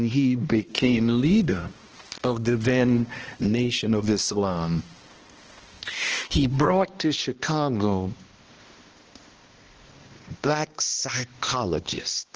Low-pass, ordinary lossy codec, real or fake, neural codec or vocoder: 7.2 kHz; Opus, 16 kbps; fake; codec, 16 kHz, 1 kbps, X-Codec, HuBERT features, trained on balanced general audio